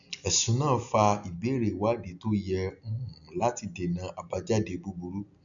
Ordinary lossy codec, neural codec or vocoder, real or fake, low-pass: none; none; real; 7.2 kHz